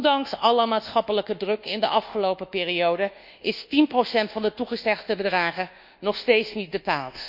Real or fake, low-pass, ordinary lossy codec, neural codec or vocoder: fake; 5.4 kHz; none; codec, 24 kHz, 1.2 kbps, DualCodec